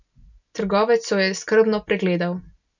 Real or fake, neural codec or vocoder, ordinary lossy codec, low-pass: real; none; none; 7.2 kHz